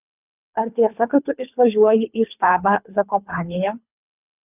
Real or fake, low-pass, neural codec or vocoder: fake; 3.6 kHz; codec, 24 kHz, 3 kbps, HILCodec